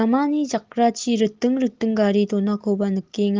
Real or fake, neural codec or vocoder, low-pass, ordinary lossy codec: real; none; 7.2 kHz; Opus, 16 kbps